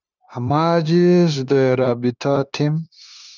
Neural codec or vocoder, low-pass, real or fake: codec, 16 kHz, 0.9 kbps, LongCat-Audio-Codec; 7.2 kHz; fake